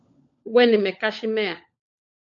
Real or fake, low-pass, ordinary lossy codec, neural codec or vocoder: fake; 7.2 kHz; MP3, 48 kbps; codec, 16 kHz, 16 kbps, FunCodec, trained on LibriTTS, 50 frames a second